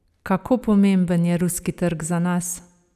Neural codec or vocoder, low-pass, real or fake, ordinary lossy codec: none; 14.4 kHz; real; none